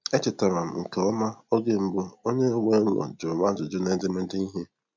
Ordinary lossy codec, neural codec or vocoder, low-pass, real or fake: MP3, 64 kbps; none; 7.2 kHz; real